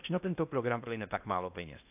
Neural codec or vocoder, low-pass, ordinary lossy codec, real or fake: codec, 16 kHz in and 24 kHz out, 0.6 kbps, FocalCodec, streaming, 4096 codes; 3.6 kHz; AAC, 32 kbps; fake